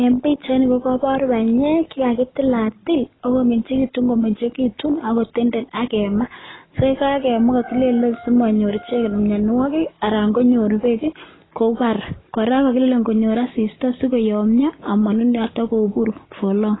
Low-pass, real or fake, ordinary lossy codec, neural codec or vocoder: 7.2 kHz; real; AAC, 16 kbps; none